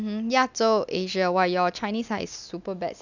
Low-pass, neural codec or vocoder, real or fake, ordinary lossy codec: 7.2 kHz; none; real; none